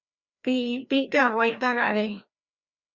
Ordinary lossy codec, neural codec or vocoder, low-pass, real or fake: Opus, 64 kbps; codec, 16 kHz, 1 kbps, FreqCodec, larger model; 7.2 kHz; fake